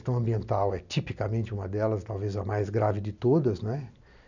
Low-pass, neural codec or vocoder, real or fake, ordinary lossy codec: 7.2 kHz; none; real; none